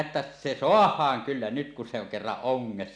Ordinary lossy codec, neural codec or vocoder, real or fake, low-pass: none; none; real; 9.9 kHz